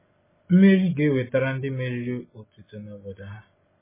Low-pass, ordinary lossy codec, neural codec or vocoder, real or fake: 3.6 kHz; MP3, 16 kbps; none; real